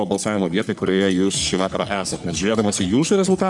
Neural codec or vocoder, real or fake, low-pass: codec, 44.1 kHz, 3.4 kbps, Pupu-Codec; fake; 10.8 kHz